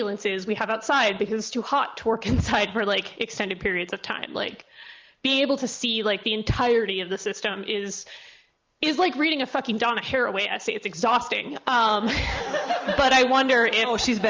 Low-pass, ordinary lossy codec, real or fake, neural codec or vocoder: 7.2 kHz; Opus, 32 kbps; real; none